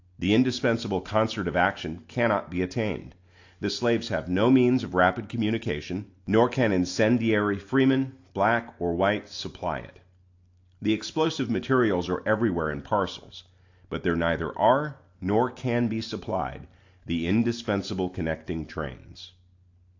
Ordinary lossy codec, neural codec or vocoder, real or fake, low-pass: AAC, 48 kbps; none; real; 7.2 kHz